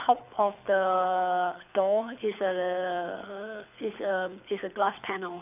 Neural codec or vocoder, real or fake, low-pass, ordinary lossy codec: codec, 24 kHz, 6 kbps, HILCodec; fake; 3.6 kHz; none